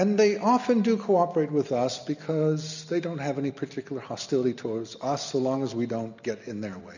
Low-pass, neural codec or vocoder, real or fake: 7.2 kHz; none; real